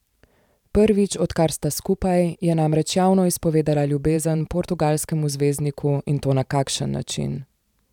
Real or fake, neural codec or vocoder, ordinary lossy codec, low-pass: fake; vocoder, 44.1 kHz, 128 mel bands every 512 samples, BigVGAN v2; none; 19.8 kHz